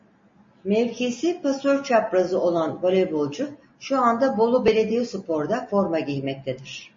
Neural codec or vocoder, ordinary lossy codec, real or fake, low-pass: none; MP3, 32 kbps; real; 7.2 kHz